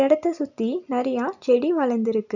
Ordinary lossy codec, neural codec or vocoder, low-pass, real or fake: none; none; 7.2 kHz; real